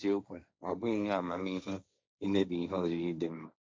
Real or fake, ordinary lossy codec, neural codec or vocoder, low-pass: fake; none; codec, 16 kHz, 1.1 kbps, Voila-Tokenizer; none